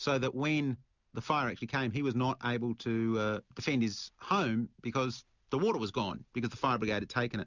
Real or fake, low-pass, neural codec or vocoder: real; 7.2 kHz; none